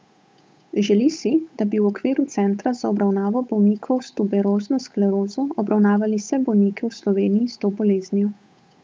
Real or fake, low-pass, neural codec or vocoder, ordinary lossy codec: fake; none; codec, 16 kHz, 8 kbps, FunCodec, trained on Chinese and English, 25 frames a second; none